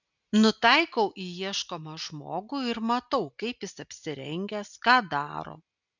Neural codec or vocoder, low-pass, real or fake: none; 7.2 kHz; real